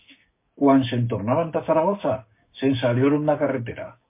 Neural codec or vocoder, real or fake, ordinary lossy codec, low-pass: codec, 16 kHz, 6 kbps, DAC; fake; MP3, 24 kbps; 3.6 kHz